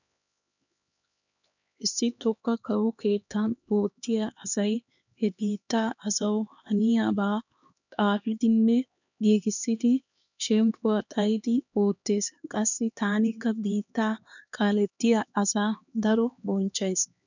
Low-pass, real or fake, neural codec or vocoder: 7.2 kHz; fake; codec, 16 kHz, 2 kbps, X-Codec, HuBERT features, trained on LibriSpeech